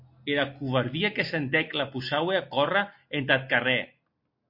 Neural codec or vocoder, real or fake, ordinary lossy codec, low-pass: none; real; MP3, 32 kbps; 5.4 kHz